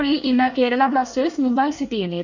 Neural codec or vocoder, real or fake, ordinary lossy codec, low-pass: codec, 24 kHz, 1 kbps, SNAC; fake; none; 7.2 kHz